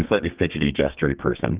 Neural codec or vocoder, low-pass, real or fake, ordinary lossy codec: codec, 32 kHz, 1.9 kbps, SNAC; 3.6 kHz; fake; Opus, 24 kbps